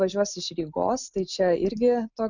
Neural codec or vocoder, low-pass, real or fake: none; 7.2 kHz; real